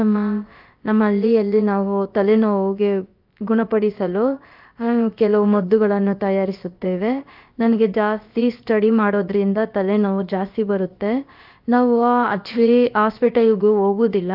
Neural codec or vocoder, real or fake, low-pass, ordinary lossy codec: codec, 16 kHz, about 1 kbps, DyCAST, with the encoder's durations; fake; 5.4 kHz; Opus, 24 kbps